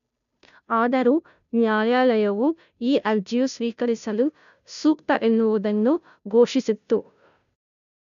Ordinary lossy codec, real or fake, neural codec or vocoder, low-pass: none; fake; codec, 16 kHz, 0.5 kbps, FunCodec, trained on Chinese and English, 25 frames a second; 7.2 kHz